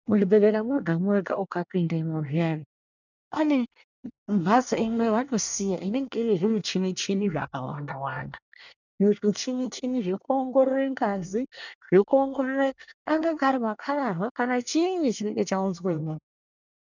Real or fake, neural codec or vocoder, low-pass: fake; codec, 24 kHz, 1 kbps, SNAC; 7.2 kHz